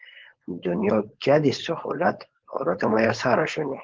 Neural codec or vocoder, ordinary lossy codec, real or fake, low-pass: codec, 16 kHz, 4.8 kbps, FACodec; Opus, 16 kbps; fake; 7.2 kHz